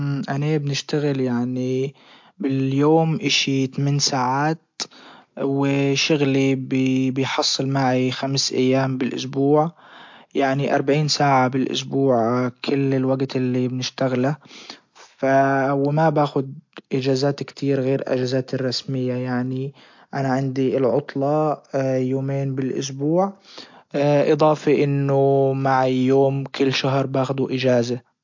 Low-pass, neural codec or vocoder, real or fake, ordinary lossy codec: 7.2 kHz; none; real; MP3, 48 kbps